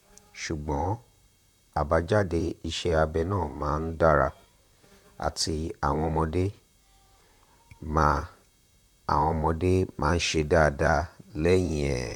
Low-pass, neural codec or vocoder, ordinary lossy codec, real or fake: 19.8 kHz; vocoder, 44.1 kHz, 128 mel bands, Pupu-Vocoder; none; fake